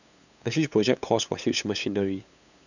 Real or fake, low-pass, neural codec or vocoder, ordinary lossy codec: fake; 7.2 kHz; codec, 16 kHz, 8 kbps, FunCodec, trained on LibriTTS, 25 frames a second; none